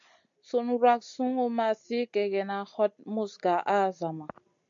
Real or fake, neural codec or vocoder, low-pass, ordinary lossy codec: real; none; 7.2 kHz; AAC, 64 kbps